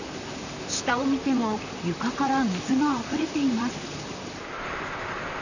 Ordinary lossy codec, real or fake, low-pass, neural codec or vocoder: none; fake; 7.2 kHz; vocoder, 44.1 kHz, 128 mel bands, Pupu-Vocoder